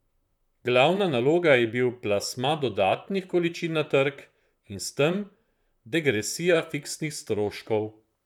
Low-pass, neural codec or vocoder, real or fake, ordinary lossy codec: 19.8 kHz; vocoder, 44.1 kHz, 128 mel bands, Pupu-Vocoder; fake; none